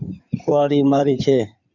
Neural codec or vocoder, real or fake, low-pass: codec, 16 kHz in and 24 kHz out, 2.2 kbps, FireRedTTS-2 codec; fake; 7.2 kHz